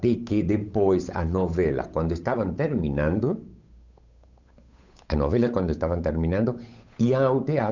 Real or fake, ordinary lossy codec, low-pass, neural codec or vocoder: fake; none; 7.2 kHz; codec, 16 kHz, 8 kbps, FunCodec, trained on Chinese and English, 25 frames a second